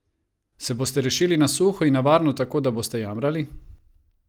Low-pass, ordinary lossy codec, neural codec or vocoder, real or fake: 19.8 kHz; Opus, 32 kbps; none; real